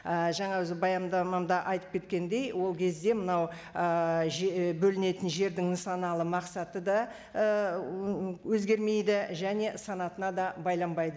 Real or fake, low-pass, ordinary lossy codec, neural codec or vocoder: real; none; none; none